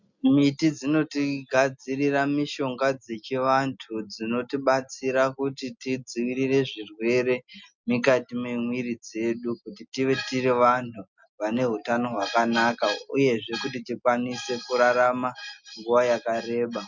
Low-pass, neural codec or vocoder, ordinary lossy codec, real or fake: 7.2 kHz; none; MP3, 64 kbps; real